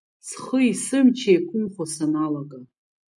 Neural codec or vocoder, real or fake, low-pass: none; real; 10.8 kHz